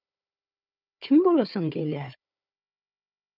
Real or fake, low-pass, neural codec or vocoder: fake; 5.4 kHz; codec, 16 kHz, 4 kbps, FunCodec, trained on Chinese and English, 50 frames a second